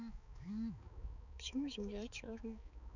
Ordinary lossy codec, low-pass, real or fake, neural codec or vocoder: none; 7.2 kHz; fake; codec, 16 kHz, 4 kbps, X-Codec, HuBERT features, trained on balanced general audio